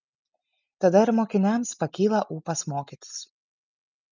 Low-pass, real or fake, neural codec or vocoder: 7.2 kHz; real; none